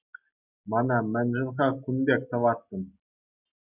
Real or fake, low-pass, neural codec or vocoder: real; 3.6 kHz; none